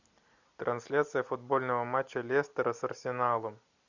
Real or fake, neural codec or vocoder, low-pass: real; none; 7.2 kHz